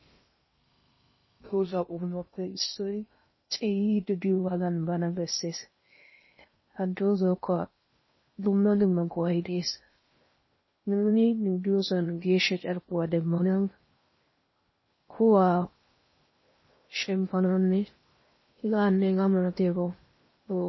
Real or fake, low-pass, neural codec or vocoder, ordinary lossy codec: fake; 7.2 kHz; codec, 16 kHz in and 24 kHz out, 0.6 kbps, FocalCodec, streaming, 2048 codes; MP3, 24 kbps